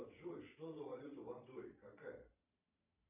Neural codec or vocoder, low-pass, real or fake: vocoder, 22.05 kHz, 80 mel bands, Vocos; 3.6 kHz; fake